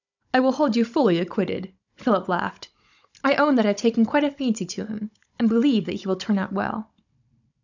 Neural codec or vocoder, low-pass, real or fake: codec, 16 kHz, 16 kbps, FunCodec, trained on Chinese and English, 50 frames a second; 7.2 kHz; fake